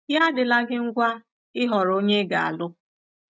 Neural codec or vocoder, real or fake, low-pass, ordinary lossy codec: none; real; none; none